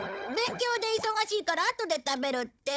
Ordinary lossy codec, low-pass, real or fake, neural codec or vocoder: none; none; fake; codec, 16 kHz, 16 kbps, FunCodec, trained on Chinese and English, 50 frames a second